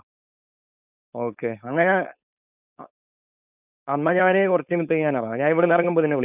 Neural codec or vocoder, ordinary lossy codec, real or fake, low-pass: codec, 16 kHz, 4.8 kbps, FACodec; none; fake; 3.6 kHz